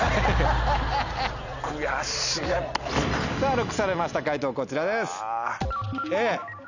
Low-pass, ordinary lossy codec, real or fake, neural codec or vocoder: 7.2 kHz; none; real; none